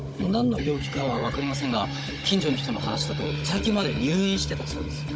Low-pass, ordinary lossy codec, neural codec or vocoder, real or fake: none; none; codec, 16 kHz, 16 kbps, FunCodec, trained on Chinese and English, 50 frames a second; fake